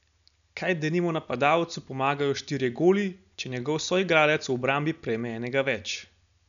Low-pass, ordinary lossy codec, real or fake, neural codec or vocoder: 7.2 kHz; none; real; none